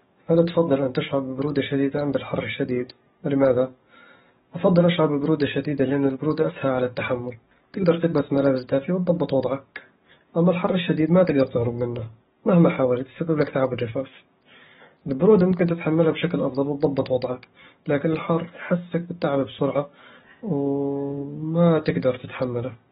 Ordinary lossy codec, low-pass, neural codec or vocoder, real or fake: AAC, 16 kbps; 19.8 kHz; codec, 44.1 kHz, 7.8 kbps, DAC; fake